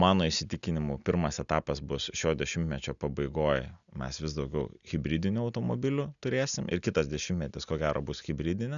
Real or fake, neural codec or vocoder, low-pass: real; none; 7.2 kHz